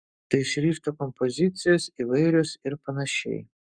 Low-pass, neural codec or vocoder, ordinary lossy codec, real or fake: 9.9 kHz; codec, 44.1 kHz, 7.8 kbps, Pupu-Codec; Opus, 64 kbps; fake